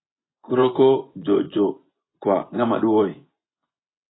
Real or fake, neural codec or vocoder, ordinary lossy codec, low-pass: fake; vocoder, 24 kHz, 100 mel bands, Vocos; AAC, 16 kbps; 7.2 kHz